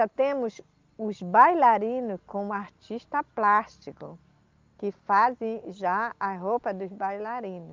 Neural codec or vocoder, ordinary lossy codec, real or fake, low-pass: none; Opus, 32 kbps; real; 7.2 kHz